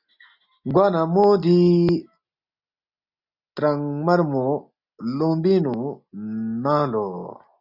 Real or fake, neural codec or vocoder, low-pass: real; none; 5.4 kHz